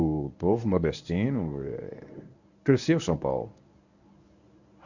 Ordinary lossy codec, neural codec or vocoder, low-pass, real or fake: none; codec, 24 kHz, 0.9 kbps, WavTokenizer, medium speech release version 1; 7.2 kHz; fake